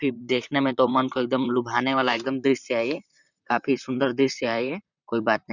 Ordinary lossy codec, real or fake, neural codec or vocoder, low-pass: none; fake; vocoder, 44.1 kHz, 128 mel bands, Pupu-Vocoder; 7.2 kHz